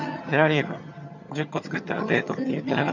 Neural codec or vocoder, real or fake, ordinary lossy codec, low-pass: vocoder, 22.05 kHz, 80 mel bands, HiFi-GAN; fake; none; 7.2 kHz